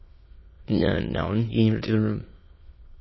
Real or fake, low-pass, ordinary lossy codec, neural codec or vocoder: fake; 7.2 kHz; MP3, 24 kbps; autoencoder, 22.05 kHz, a latent of 192 numbers a frame, VITS, trained on many speakers